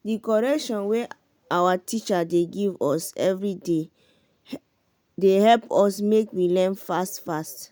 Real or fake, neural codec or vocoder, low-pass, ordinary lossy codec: real; none; none; none